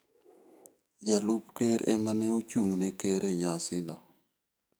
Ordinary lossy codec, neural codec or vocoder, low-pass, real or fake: none; codec, 44.1 kHz, 2.6 kbps, SNAC; none; fake